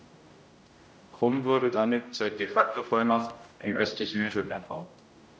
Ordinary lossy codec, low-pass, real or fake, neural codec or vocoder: none; none; fake; codec, 16 kHz, 0.5 kbps, X-Codec, HuBERT features, trained on general audio